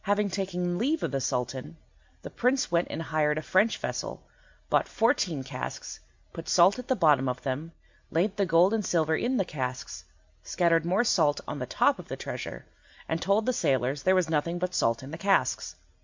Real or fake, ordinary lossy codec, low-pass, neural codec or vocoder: real; MP3, 64 kbps; 7.2 kHz; none